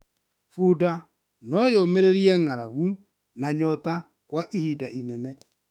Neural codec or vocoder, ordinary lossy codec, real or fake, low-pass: autoencoder, 48 kHz, 32 numbers a frame, DAC-VAE, trained on Japanese speech; none; fake; 19.8 kHz